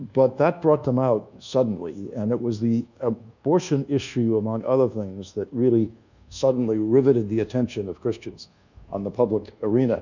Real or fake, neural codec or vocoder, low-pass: fake; codec, 24 kHz, 1.2 kbps, DualCodec; 7.2 kHz